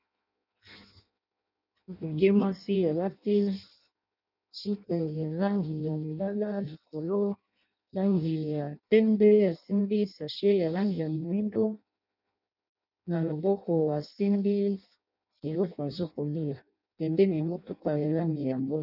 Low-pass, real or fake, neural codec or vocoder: 5.4 kHz; fake; codec, 16 kHz in and 24 kHz out, 0.6 kbps, FireRedTTS-2 codec